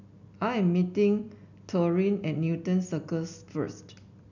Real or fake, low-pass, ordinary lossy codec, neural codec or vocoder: real; 7.2 kHz; none; none